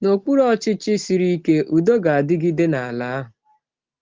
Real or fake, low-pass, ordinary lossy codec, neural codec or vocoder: real; 7.2 kHz; Opus, 16 kbps; none